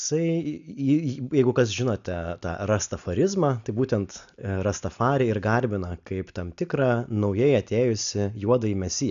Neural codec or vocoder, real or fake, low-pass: none; real; 7.2 kHz